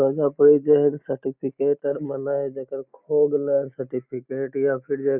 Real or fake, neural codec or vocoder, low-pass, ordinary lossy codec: real; none; 3.6 kHz; none